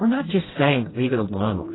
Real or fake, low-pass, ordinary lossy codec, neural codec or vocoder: fake; 7.2 kHz; AAC, 16 kbps; codec, 16 kHz, 1 kbps, FreqCodec, smaller model